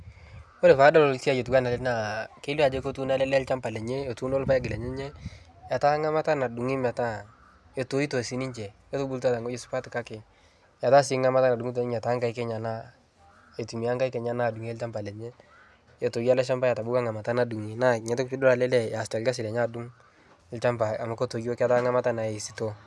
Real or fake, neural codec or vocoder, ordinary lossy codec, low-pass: real; none; none; none